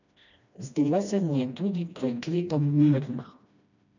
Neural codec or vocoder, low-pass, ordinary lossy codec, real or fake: codec, 16 kHz, 1 kbps, FreqCodec, smaller model; 7.2 kHz; none; fake